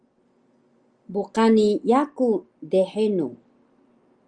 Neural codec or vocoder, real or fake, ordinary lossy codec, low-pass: none; real; Opus, 32 kbps; 9.9 kHz